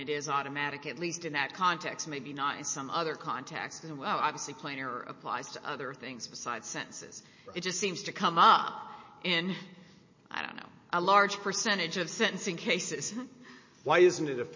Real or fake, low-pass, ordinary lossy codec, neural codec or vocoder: real; 7.2 kHz; MP3, 32 kbps; none